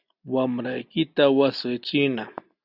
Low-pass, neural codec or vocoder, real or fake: 5.4 kHz; none; real